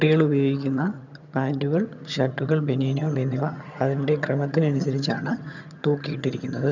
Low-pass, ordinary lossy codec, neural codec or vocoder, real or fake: 7.2 kHz; none; vocoder, 22.05 kHz, 80 mel bands, HiFi-GAN; fake